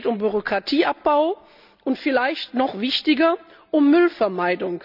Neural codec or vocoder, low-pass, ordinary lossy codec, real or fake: none; 5.4 kHz; none; real